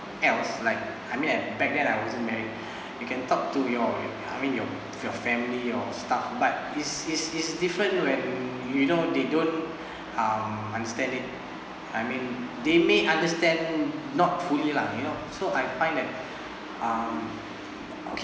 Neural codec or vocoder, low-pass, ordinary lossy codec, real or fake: none; none; none; real